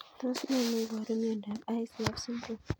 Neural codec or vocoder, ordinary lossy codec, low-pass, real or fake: none; none; none; real